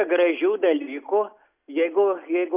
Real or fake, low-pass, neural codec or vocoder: real; 3.6 kHz; none